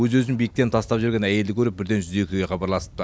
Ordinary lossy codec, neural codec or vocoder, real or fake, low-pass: none; none; real; none